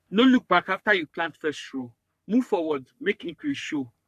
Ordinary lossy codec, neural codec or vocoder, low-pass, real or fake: none; codec, 44.1 kHz, 3.4 kbps, Pupu-Codec; 14.4 kHz; fake